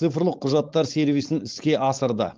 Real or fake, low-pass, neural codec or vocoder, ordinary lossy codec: fake; 7.2 kHz; codec, 16 kHz, 4.8 kbps, FACodec; Opus, 32 kbps